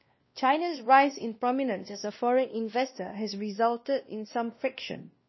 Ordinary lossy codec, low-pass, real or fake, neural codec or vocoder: MP3, 24 kbps; 7.2 kHz; fake; codec, 16 kHz, 1 kbps, X-Codec, WavLM features, trained on Multilingual LibriSpeech